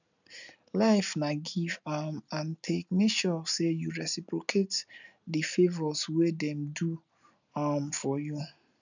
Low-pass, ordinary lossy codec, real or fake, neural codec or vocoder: 7.2 kHz; none; real; none